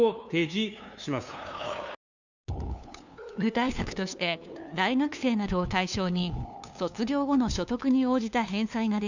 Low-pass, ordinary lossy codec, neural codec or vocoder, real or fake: 7.2 kHz; none; codec, 16 kHz, 2 kbps, FunCodec, trained on LibriTTS, 25 frames a second; fake